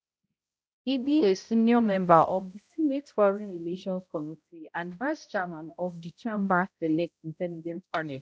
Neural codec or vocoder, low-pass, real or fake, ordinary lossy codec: codec, 16 kHz, 0.5 kbps, X-Codec, HuBERT features, trained on balanced general audio; none; fake; none